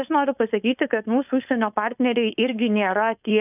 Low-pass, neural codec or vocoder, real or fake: 3.6 kHz; codec, 16 kHz, 4.8 kbps, FACodec; fake